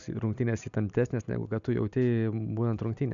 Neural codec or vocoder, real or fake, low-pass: none; real; 7.2 kHz